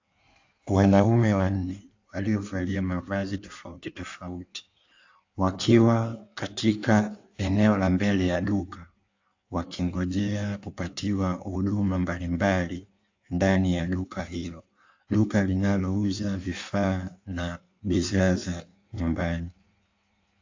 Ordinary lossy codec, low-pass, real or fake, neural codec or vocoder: AAC, 48 kbps; 7.2 kHz; fake; codec, 16 kHz in and 24 kHz out, 1.1 kbps, FireRedTTS-2 codec